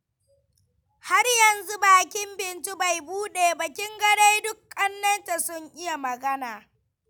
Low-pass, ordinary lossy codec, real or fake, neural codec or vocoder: none; none; real; none